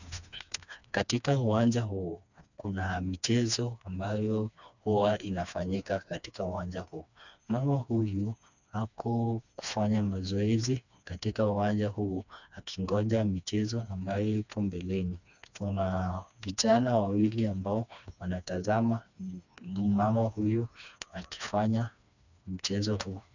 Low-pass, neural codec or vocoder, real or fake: 7.2 kHz; codec, 16 kHz, 2 kbps, FreqCodec, smaller model; fake